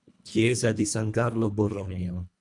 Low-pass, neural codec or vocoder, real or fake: 10.8 kHz; codec, 24 kHz, 1.5 kbps, HILCodec; fake